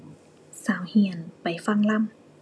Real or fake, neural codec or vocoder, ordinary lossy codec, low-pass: real; none; none; none